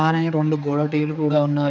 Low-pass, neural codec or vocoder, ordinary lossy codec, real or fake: none; codec, 16 kHz, 4 kbps, X-Codec, HuBERT features, trained on balanced general audio; none; fake